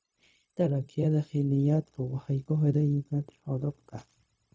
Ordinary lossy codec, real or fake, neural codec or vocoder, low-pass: none; fake; codec, 16 kHz, 0.4 kbps, LongCat-Audio-Codec; none